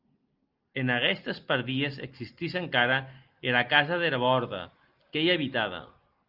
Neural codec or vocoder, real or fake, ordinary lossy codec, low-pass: none; real; Opus, 24 kbps; 5.4 kHz